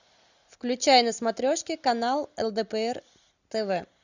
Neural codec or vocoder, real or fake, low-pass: none; real; 7.2 kHz